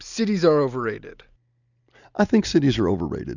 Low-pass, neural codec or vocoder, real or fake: 7.2 kHz; none; real